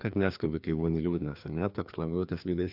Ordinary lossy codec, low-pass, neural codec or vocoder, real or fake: AAC, 48 kbps; 5.4 kHz; codec, 16 kHz, 2 kbps, FreqCodec, larger model; fake